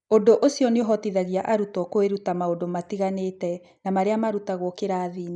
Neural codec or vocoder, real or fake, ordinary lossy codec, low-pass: none; real; none; 9.9 kHz